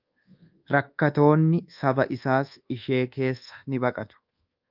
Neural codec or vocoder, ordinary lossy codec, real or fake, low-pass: codec, 24 kHz, 1.2 kbps, DualCodec; Opus, 24 kbps; fake; 5.4 kHz